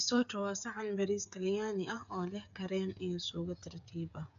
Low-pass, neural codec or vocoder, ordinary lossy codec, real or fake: 7.2 kHz; codec, 16 kHz, 8 kbps, FreqCodec, smaller model; AAC, 96 kbps; fake